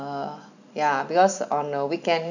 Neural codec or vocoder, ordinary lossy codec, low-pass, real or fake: none; none; 7.2 kHz; real